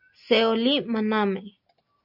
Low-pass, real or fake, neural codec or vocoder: 5.4 kHz; real; none